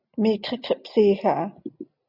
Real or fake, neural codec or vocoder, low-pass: real; none; 5.4 kHz